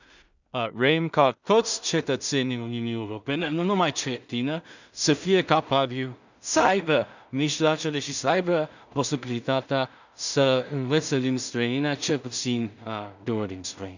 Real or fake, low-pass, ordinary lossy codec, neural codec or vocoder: fake; 7.2 kHz; none; codec, 16 kHz in and 24 kHz out, 0.4 kbps, LongCat-Audio-Codec, two codebook decoder